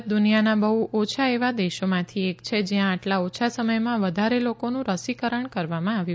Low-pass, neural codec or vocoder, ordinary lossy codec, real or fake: none; none; none; real